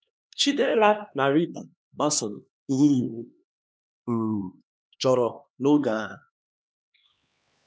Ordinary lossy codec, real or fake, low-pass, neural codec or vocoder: none; fake; none; codec, 16 kHz, 2 kbps, X-Codec, HuBERT features, trained on LibriSpeech